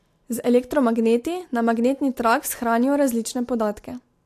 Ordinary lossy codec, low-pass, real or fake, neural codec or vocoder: AAC, 64 kbps; 14.4 kHz; real; none